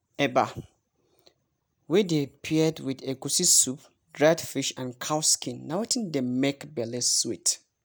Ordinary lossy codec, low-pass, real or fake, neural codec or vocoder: none; none; real; none